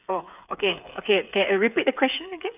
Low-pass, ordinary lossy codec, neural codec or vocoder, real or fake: 3.6 kHz; none; codec, 16 kHz, 8 kbps, FreqCodec, smaller model; fake